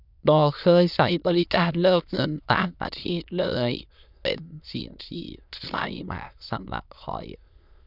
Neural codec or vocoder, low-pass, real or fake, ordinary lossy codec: autoencoder, 22.05 kHz, a latent of 192 numbers a frame, VITS, trained on many speakers; 5.4 kHz; fake; none